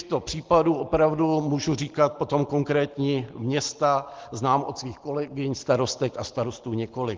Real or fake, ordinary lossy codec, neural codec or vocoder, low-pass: real; Opus, 16 kbps; none; 7.2 kHz